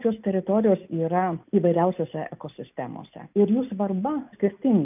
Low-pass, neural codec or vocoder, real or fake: 3.6 kHz; none; real